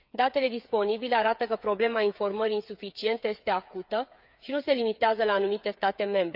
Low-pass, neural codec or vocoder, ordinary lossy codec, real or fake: 5.4 kHz; codec, 16 kHz, 8 kbps, FreqCodec, smaller model; none; fake